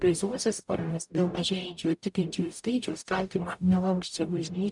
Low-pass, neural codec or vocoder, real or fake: 10.8 kHz; codec, 44.1 kHz, 0.9 kbps, DAC; fake